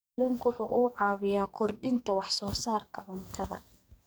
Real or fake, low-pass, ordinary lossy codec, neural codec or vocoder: fake; none; none; codec, 44.1 kHz, 2.6 kbps, SNAC